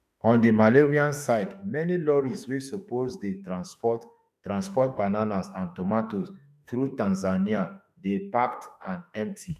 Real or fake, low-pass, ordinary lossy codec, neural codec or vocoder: fake; 14.4 kHz; none; autoencoder, 48 kHz, 32 numbers a frame, DAC-VAE, trained on Japanese speech